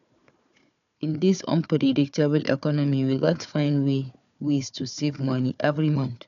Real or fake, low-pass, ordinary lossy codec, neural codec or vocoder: fake; 7.2 kHz; none; codec, 16 kHz, 4 kbps, FunCodec, trained on Chinese and English, 50 frames a second